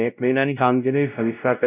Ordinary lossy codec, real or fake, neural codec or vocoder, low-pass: none; fake; codec, 16 kHz, 0.5 kbps, X-Codec, WavLM features, trained on Multilingual LibriSpeech; 3.6 kHz